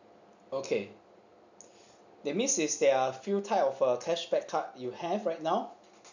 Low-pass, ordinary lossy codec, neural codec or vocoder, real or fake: 7.2 kHz; none; none; real